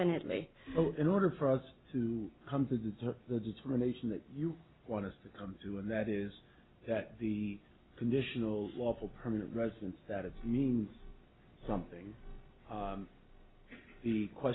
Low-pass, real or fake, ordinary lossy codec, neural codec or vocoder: 7.2 kHz; real; AAC, 16 kbps; none